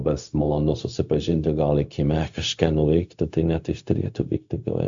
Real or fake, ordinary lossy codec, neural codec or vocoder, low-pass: fake; AAC, 64 kbps; codec, 16 kHz, 0.4 kbps, LongCat-Audio-Codec; 7.2 kHz